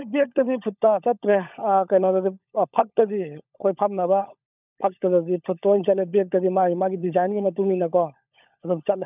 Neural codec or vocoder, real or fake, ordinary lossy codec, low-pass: codec, 16 kHz, 16 kbps, FunCodec, trained on LibriTTS, 50 frames a second; fake; none; 3.6 kHz